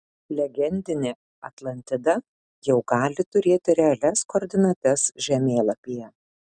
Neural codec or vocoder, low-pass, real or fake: none; 9.9 kHz; real